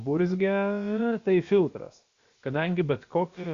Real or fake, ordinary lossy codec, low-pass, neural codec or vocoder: fake; Opus, 64 kbps; 7.2 kHz; codec, 16 kHz, about 1 kbps, DyCAST, with the encoder's durations